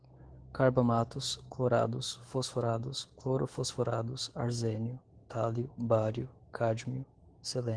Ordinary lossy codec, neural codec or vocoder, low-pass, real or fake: Opus, 16 kbps; autoencoder, 48 kHz, 128 numbers a frame, DAC-VAE, trained on Japanese speech; 9.9 kHz; fake